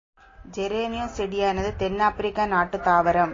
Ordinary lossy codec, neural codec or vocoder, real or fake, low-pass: AAC, 32 kbps; none; real; 7.2 kHz